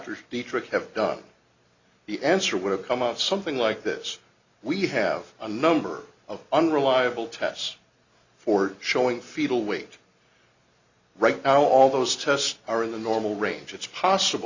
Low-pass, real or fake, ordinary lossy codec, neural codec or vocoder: 7.2 kHz; real; Opus, 64 kbps; none